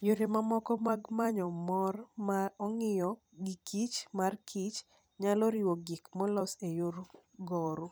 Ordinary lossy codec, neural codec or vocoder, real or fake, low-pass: none; vocoder, 44.1 kHz, 128 mel bands every 256 samples, BigVGAN v2; fake; none